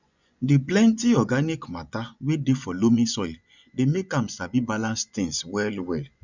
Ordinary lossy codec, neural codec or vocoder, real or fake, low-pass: none; vocoder, 24 kHz, 100 mel bands, Vocos; fake; 7.2 kHz